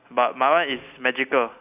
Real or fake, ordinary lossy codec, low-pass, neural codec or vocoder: real; AAC, 32 kbps; 3.6 kHz; none